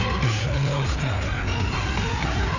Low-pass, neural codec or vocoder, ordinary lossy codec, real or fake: 7.2 kHz; codec, 16 kHz, 4 kbps, FreqCodec, larger model; none; fake